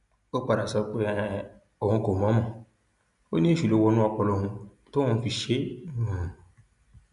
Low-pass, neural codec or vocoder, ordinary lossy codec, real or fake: 10.8 kHz; none; none; real